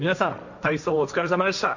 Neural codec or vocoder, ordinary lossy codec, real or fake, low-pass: codec, 16 kHz, 1.1 kbps, Voila-Tokenizer; none; fake; 7.2 kHz